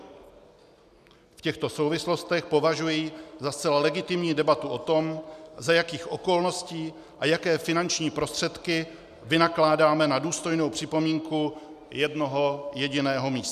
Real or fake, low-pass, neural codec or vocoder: real; 14.4 kHz; none